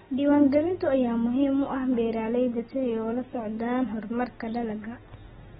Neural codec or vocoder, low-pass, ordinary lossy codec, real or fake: none; 7.2 kHz; AAC, 16 kbps; real